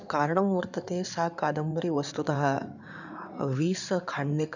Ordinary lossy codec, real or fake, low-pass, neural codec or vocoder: none; fake; 7.2 kHz; codec, 16 kHz in and 24 kHz out, 2.2 kbps, FireRedTTS-2 codec